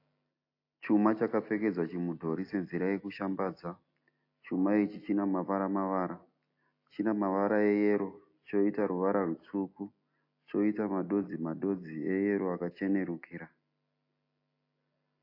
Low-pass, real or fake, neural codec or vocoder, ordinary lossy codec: 5.4 kHz; real; none; AAC, 32 kbps